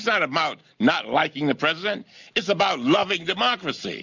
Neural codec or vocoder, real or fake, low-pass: none; real; 7.2 kHz